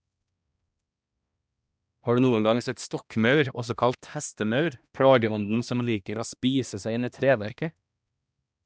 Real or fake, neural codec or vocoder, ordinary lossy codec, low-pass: fake; codec, 16 kHz, 1 kbps, X-Codec, HuBERT features, trained on balanced general audio; none; none